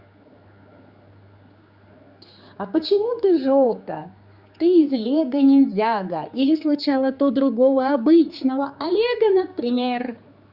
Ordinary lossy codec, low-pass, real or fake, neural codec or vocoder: Opus, 64 kbps; 5.4 kHz; fake; codec, 16 kHz, 4 kbps, X-Codec, HuBERT features, trained on general audio